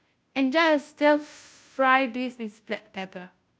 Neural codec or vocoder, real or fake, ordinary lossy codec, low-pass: codec, 16 kHz, 0.5 kbps, FunCodec, trained on Chinese and English, 25 frames a second; fake; none; none